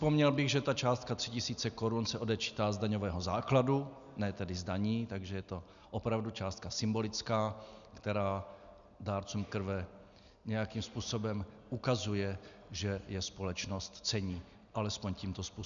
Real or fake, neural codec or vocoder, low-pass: real; none; 7.2 kHz